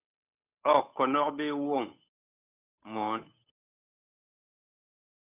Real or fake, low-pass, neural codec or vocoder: fake; 3.6 kHz; codec, 16 kHz, 8 kbps, FunCodec, trained on Chinese and English, 25 frames a second